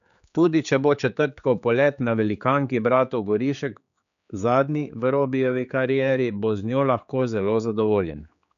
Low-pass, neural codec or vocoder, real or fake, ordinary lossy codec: 7.2 kHz; codec, 16 kHz, 4 kbps, X-Codec, HuBERT features, trained on general audio; fake; none